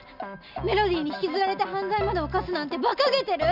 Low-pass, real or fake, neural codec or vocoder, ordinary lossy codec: 5.4 kHz; real; none; none